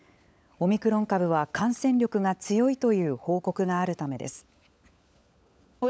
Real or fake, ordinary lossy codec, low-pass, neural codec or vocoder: fake; none; none; codec, 16 kHz, 16 kbps, FunCodec, trained on LibriTTS, 50 frames a second